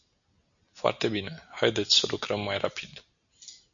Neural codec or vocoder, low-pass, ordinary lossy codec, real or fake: none; 7.2 kHz; MP3, 96 kbps; real